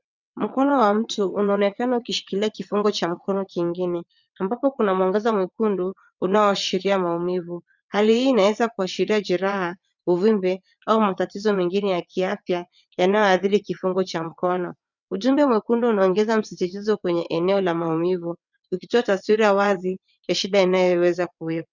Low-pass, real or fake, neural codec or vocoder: 7.2 kHz; fake; vocoder, 22.05 kHz, 80 mel bands, WaveNeXt